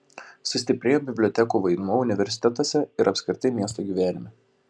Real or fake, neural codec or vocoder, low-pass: real; none; 9.9 kHz